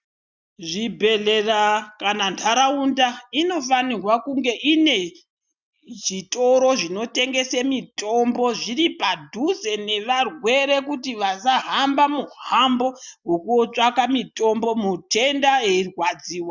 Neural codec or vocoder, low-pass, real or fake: none; 7.2 kHz; real